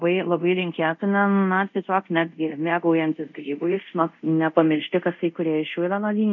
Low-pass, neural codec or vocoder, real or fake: 7.2 kHz; codec, 24 kHz, 0.5 kbps, DualCodec; fake